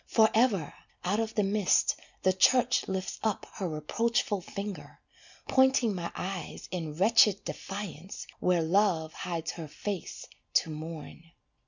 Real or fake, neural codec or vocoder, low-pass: real; none; 7.2 kHz